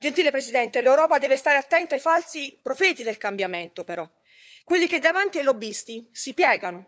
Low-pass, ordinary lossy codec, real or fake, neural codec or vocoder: none; none; fake; codec, 16 kHz, 4 kbps, FunCodec, trained on LibriTTS, 50 frames a second